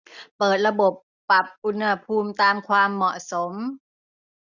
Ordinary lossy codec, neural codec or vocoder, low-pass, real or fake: none; none; 7.2 kHz; real